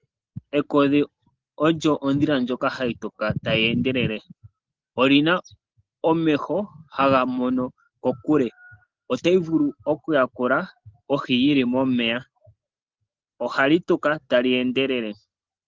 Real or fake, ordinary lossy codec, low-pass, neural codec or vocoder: real; Opus, 16 kbps; 7.2 kHz; none